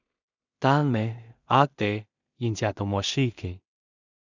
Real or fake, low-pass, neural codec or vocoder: fake; 7.2 kHz; codec, 16 kHz in and 24 kHz out, 0.4 kbps, LongCat-Audio-Codec, two codebook decoder